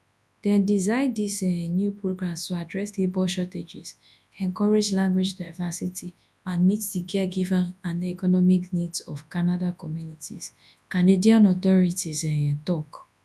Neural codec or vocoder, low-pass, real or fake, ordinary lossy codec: codec, 24 kHz, 0.9 kbps, WavTokenizer, large speech release; none; fake; none